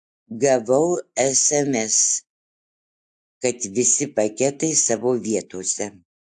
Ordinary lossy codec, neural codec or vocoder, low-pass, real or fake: AAC, 64 kbps; none; 10.8 kHz; real